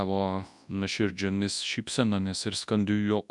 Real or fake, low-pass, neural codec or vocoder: fake; 10.8 kHz; codec, 24 kHz, 0.9 kbps, WavTokenizer, large speech release